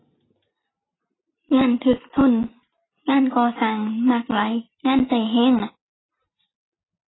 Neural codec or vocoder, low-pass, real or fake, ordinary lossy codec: none; 7.2 kHz; real; AAC, 16 kbps